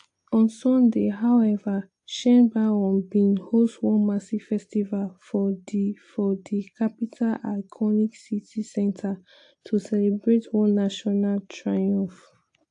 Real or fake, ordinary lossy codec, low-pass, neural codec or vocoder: real; AAC, 48 kbps; 9.9 kHz; none